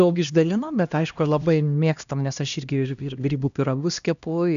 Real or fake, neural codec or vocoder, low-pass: fake; codec, 16 kHz, 1 kbps, X-Codec, HuBERT features, trained on LibriSpeech; 7.2 kHz